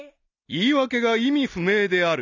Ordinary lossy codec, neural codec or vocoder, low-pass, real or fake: none; none; 7.2 kHz; real